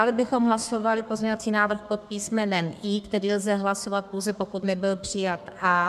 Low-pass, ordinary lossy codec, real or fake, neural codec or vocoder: 14.4 kHz; MP3, 96 kbps; fake; codec, 32 kHz, 1.9 kbps, SNAC